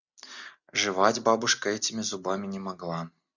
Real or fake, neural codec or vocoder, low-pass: real; none; 7.2 kHz